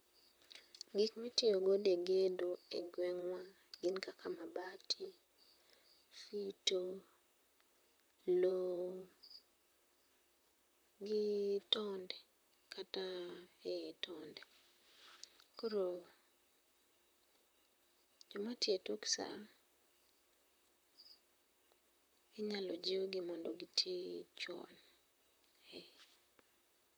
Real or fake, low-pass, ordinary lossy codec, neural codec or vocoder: fake; none; none; vocoder, 44.1 kHz, 128 mel bands, Pupu-Vocoder